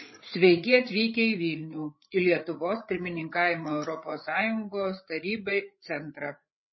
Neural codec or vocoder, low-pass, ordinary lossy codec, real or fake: codec, 16 kHz, 8 kbps, FreqCodec, larger model; 7.2 kHz; MP3, 24 kbps; fake